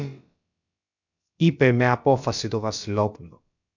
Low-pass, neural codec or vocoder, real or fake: 7.2 kHz; codec, 16 kHz, about 1 kbps, DyCAST, with the encoder's durations; fake